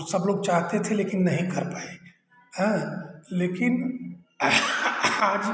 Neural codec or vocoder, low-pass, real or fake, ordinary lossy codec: none; none; real; none